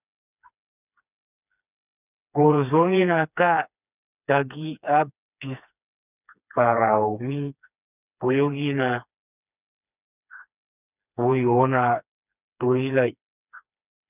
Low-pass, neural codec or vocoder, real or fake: 3.6 kHz; codec, 16 kHz, 2 kbps, FreqCodec, smaller model; fake